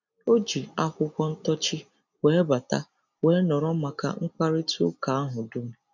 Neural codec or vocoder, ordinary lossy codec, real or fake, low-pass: none; none; real; 7.2 kHz